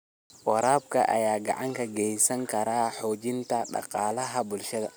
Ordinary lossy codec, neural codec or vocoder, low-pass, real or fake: none; none; none; real